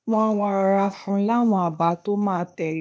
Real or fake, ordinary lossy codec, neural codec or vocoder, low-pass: fake; none; codec, 16 kHz, 0.8 kbps, ZipCodec; none